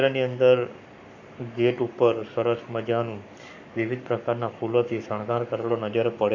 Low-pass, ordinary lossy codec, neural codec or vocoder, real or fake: 7.2 kHz; none; codec, 44.1 kHz, 7.8 kbps, Pupu-Codec; fake